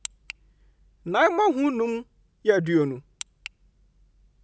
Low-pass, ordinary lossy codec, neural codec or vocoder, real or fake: none; none; none; real